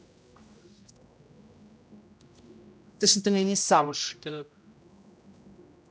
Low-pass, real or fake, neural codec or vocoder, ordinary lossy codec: none; fake; codec, 16 kHz, 0.5 kbps, X-Codec, HuBERT features, trained on general audio; none